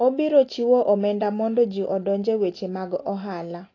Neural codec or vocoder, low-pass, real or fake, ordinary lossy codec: none; 7.2 kHz; real; AAC, 32 kbps